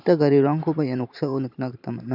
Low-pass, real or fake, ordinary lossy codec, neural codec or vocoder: 5.4 kHz; fake; none; vocoder, 44.1 kHz, 128 mel bands every 512 samples, BigVGAN v2